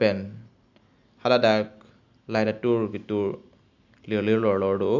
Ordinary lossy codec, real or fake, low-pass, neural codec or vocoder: none; real; 7.2 kHz; none